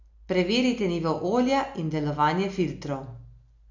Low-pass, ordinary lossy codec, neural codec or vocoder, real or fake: 7.2 kHz; none; none; real